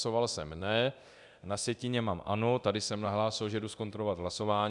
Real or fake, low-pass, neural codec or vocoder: fake; 10.8 kHz; codec, 24 kHz, 0.9 kbps, DualCodec